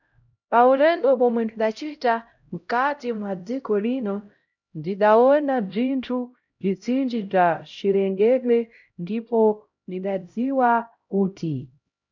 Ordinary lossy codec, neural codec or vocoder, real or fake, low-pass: MP3, 64 kbps; codec, 16 kHz, 0.5 kbps, X-Codec, HuBERT features, trained on LibriSpeech; fake; 7.2 kHz